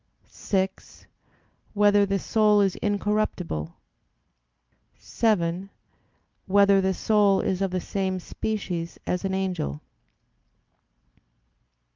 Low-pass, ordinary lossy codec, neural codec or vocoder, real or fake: 7.2 kHz; Opus, 24 kbps; none; real